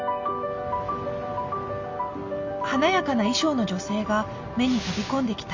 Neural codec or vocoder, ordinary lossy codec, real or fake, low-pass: none; MP3, 64 kbps; real; 7.2 kHz